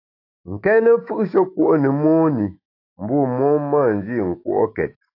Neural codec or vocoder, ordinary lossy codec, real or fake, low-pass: autoencoder, 48 kHz, 128 numbers a frame, DAC-VAE, trained on Japanese speech; AAC, 32 kbps; fake; 5.4 kHz